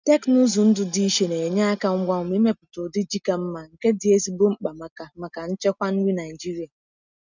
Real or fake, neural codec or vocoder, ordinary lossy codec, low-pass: real; none; none; 7.2 kHz